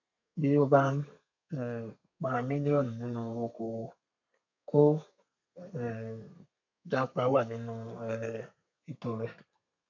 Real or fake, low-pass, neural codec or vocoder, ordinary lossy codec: fake; 7.2 kHz; codec, 32 kHz, 1.9 kbps, SNAC; none